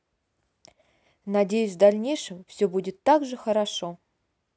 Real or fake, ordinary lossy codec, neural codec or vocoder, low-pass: real; none; none; none